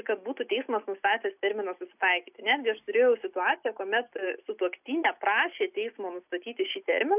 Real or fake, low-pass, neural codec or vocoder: real; 3.6 kHz; none